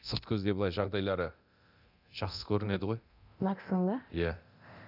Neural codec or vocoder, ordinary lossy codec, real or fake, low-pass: codec, 24 kHz, 0.9 kbps, DualCodec; none; fake; 5.4 kHz